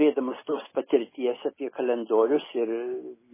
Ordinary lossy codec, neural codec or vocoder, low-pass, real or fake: MP3, 16 kbps; none; 3.6 kHz; real